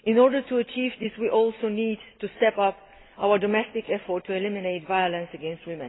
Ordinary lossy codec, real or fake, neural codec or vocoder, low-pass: AAC, 16 kbps; real; none; 7.2 kHz